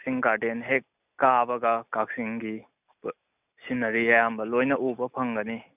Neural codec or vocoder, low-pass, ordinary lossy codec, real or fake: none; 3.6 kHz; none; real